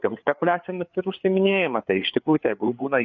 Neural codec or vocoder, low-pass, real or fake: codec, 16 kHz, 4 kbps, FreqCodec, larger model; 7.2 kHz; fake